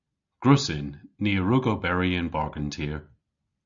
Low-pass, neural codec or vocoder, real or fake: 7.2 kHz; none; real